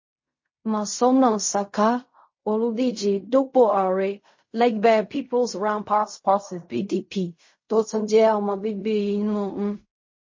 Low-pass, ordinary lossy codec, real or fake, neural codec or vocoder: 7.2 kHz; MP3, 32 kbps; fake; codec, 16 kHz in and 24 kHz out, 0.4 kbps, LongCat-Audio-Codec, fine tuned four codebook decoder